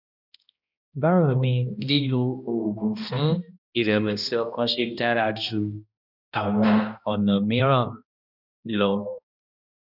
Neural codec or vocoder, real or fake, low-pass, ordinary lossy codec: codec, 16 kHz, 1 kbps, X-Codec, HuBERT features, trained on balanced general audio; fake; 5.4 kHz; none